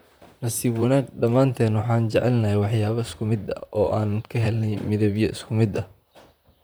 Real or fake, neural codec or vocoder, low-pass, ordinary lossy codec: fake; vocoder, 44.1 kHz, 128 mel bands, Pupu-Vocoder; none; none